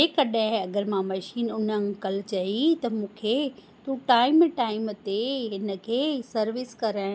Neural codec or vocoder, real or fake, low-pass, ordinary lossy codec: none; real; none; none